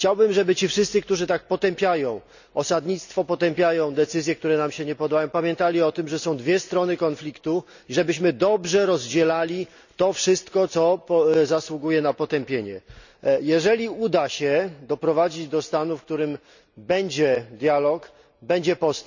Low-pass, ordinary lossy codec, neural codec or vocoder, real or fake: 7.2 kHz; none; none; real